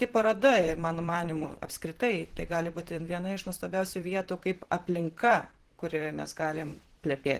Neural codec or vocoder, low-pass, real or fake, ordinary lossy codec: vocoder, 44.1 kHz, 128 mel bands, Pupu-Vocoder; 14.4 kHz; fake; Opus, 16 kbps